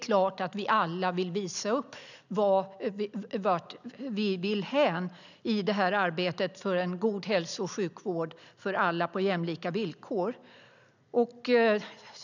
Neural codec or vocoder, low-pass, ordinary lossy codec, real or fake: none; 7.2 kHz; none; real